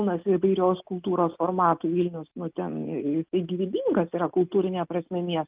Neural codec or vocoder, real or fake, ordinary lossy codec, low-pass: none; real; Opus, 32 kbps; 3.6 kHz